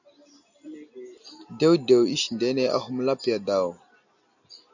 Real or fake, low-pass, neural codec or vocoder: real; 7.2 kHz; none